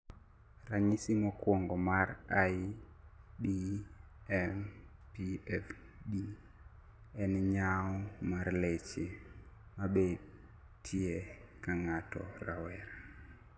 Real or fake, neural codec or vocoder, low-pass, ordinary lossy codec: real; none; none; none